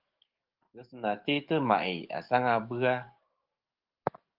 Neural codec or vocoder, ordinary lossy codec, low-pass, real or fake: codec, 44.1 kHz, 7.8 kbps, DAC; Opus, 24 kbps; 5.4 kHz; fake